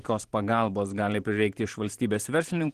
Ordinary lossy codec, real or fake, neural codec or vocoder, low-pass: Opus, 16 kbps; fake; vocoder, 44.1 kHz, 128 mel bands every 512 samples, BigVGAN v2; 14.4 kHz